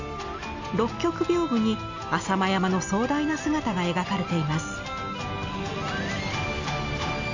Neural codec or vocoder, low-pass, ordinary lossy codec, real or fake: none; 7.2 kHz; AAC, 48 kbps; real